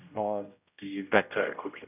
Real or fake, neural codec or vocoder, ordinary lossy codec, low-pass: fake; codec, 16 kHz, 0.5 kbps, X-Codec, HuBERT features, trained on general audio; none; 3.6 kHz